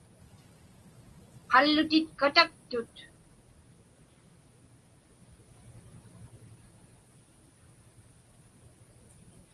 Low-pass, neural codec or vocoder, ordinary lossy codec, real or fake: 10.8 kHz; none; Opus, 24 kbps; real